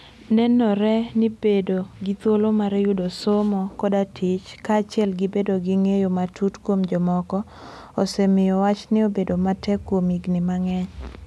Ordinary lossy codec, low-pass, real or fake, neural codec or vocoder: none; none; real; none